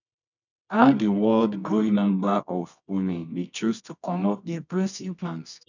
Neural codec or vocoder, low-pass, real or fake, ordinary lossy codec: codec, 24 kHz, 0.9 kbps, WavTokenizer, medium music audio release; 7.2 kHz; fake; none